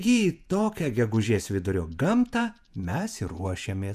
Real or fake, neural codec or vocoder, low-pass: fake; vocoder, 48 kHz, 128 mel bands, Vocos; 14.4 kHz